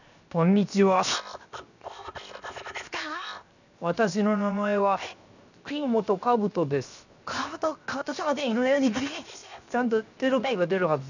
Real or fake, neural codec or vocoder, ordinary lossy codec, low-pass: fake; codec, 16 kHz, 0.7 kbps, FocalCodec; none; 7.2 kHz